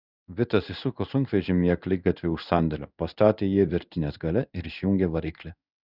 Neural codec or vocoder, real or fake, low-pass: codec, 16 kHz in and 24 kHz out, 1 kbps, XY-Tokenizer; fake; 5.4 kHz